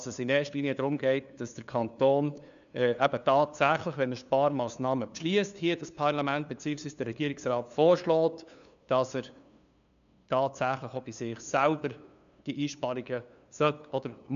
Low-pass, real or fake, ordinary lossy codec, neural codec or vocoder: 7.2 kHz; fake; MP3, 96 kbps; codec, 16 kHz, 2 kbps, FunCodec, trained on LibriTTS, 25 frames a second